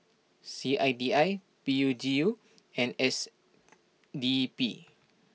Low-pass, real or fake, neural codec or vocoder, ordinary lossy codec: none; real; none; none